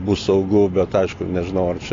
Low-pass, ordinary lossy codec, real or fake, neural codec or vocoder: 7.2 kHz; AAC, 32 kbps; real; none